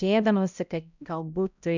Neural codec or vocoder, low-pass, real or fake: codec, 16 kHz, 0.5 kbps, X-Codec, HuBERT features, trained on balanced general audio; 7.2 kHz; fake